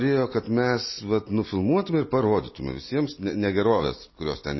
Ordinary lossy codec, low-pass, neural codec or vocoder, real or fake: MP3, 24 kbps; 7.2 kHz; none; real